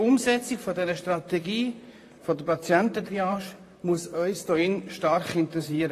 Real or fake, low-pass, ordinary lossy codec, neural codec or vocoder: fake; 14.4 kHz; AAC, 48 kbps; vocoder, 44.1 kHz, 128 mel bands, Pupu-Vocoder